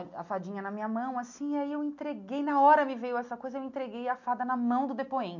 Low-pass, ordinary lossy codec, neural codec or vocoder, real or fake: 7.2 kHz; none; none; real